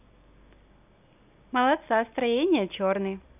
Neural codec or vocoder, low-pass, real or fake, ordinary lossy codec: none; 3.6 kHz; real; none